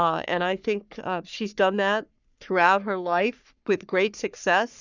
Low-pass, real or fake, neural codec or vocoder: 7.2 kHz; fake; codec, 44.1 kHz, 3.4 kbps, Pupu-Codec